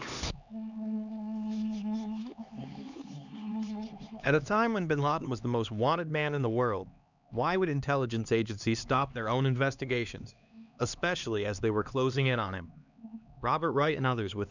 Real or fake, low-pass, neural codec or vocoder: fake; 7.2 kHz; codec, 16 kHz, 2 kbps, X-Codec, HuBERT features, trained on LibriSpeech